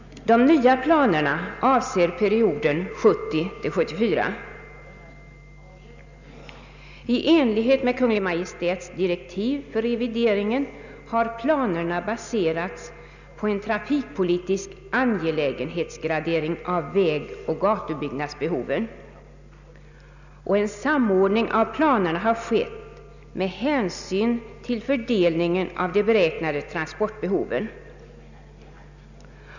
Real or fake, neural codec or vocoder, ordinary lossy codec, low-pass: real; none; none; 7.2 kHz